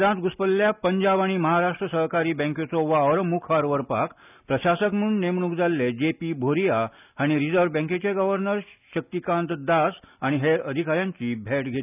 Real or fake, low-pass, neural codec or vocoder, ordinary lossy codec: real; 3.6 kHz; none; none